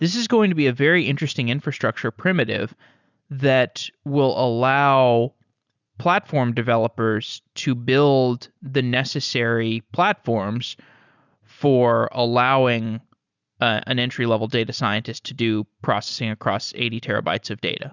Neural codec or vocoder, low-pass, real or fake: none; 7.2 kHz; real